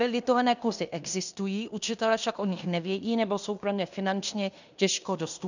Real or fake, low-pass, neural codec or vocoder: fake; 7.2 kHz; codec, 16 kHz in and 24 kHz out, 0.9 kbps, LongCat-Audio-Codec, fine tuned four codebook decoder